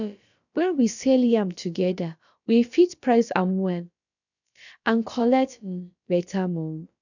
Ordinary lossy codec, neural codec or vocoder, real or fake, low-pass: none; codec, 16 kHz, about 1 kbps, DyCAST, with the encoder's durations; fake; 7.2 kHz